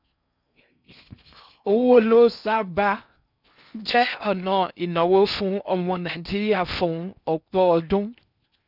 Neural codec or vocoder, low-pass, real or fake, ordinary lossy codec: codec, 16 kHz in and 24 kHz out, 0.6 kbps, FocalCodec, streaming, 4096 codes; 5.4 kHz; fake; none